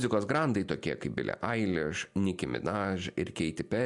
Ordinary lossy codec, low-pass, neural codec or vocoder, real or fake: MP3, 64 kbps; 10.8 kHz; none; real